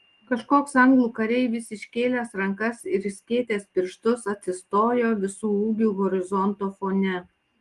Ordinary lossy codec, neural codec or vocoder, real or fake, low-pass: Opus, 24 kbps; none; real; 10.8 kHz